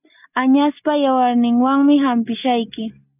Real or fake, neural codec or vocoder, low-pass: real; none; 3.6 kHz